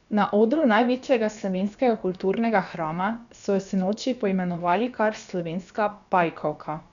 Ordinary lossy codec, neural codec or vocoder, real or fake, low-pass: none; codec, 16 kHz, about 1 kbps, DyCAST, with the encoder's durations; fake; 7.2 kHz